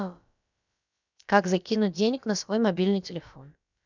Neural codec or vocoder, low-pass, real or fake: codec, 16 kHz, about 1 kbps, DyCAST, with the encoder's durations; 7.2 kHz; fake